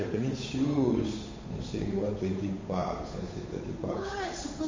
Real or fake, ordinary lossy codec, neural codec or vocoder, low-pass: fake; MP3, 32 kbps; codec, 16 kHz, 8 kbps, FunCodec, trained on Chinese and English, 25 frames a second; 7.2 kHz